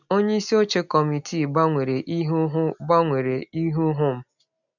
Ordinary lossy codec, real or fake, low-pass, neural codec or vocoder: none; real; 7.2 kHz; none